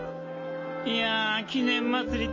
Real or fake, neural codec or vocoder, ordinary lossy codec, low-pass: real; none; MP3, 32 kbps; 7.2 kHz